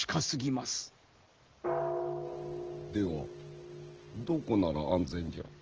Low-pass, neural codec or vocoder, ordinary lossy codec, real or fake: 7.2 kHz; none; Opus, 16 kbps; real